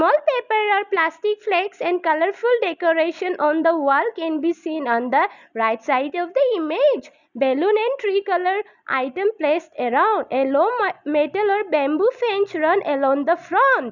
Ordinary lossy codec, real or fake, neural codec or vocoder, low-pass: none; real; none; 7.2 kHz